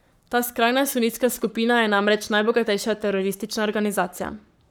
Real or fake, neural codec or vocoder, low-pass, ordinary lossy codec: fake; codec, 44.1 kHz, 7.8 kbps, Pupu-Codec; none; none